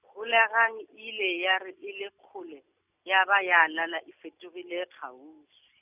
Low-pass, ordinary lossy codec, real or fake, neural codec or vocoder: 3.6 kHz; none; real; none